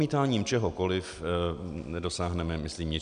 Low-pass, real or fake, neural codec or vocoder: 9.9 kHz; real; none